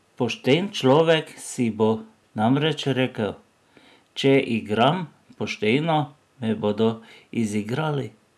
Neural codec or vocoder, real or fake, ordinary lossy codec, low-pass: none; real; none; none